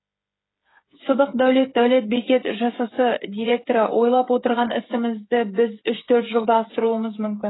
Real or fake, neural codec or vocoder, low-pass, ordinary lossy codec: fake; codec, 16 kHz, 8 kbps, FreqCodec, smaller model; 7.2 kHz; AAC, 16 kbps